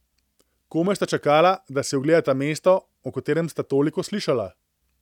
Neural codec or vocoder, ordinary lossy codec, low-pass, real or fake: none; none; 19.8 kHz; real